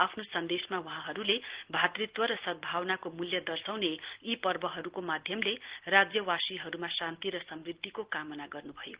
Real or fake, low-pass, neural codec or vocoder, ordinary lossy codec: real; 3.6 kHz; none; Opus, 16 kbps